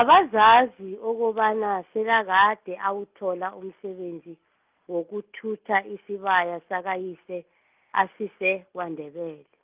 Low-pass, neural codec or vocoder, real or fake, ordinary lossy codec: 3.6 kHz; none; real; Opus, 32 kbps